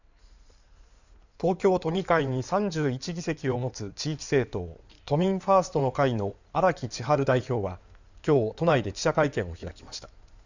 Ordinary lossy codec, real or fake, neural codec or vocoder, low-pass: none; fake; codec, 16 kHz in and 24 kHz out, 2.2 kbps, FireRedTTS-2 codec; 7.2 kHz